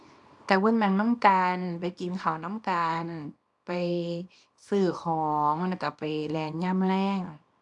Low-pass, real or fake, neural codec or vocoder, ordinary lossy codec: 10.8 kHz; fake; codec, 24 kHz, 0.9 kbps, WavTokenizer, small release; AAC, 64 kbps